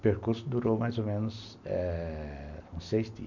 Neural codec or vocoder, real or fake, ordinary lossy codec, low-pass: none; real; none; 7.2 kHz